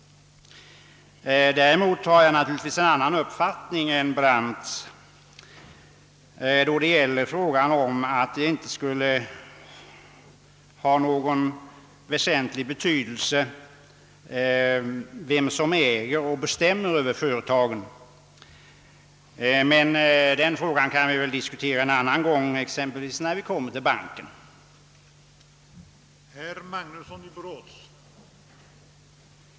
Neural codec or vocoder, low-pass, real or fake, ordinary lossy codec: none; none; real; none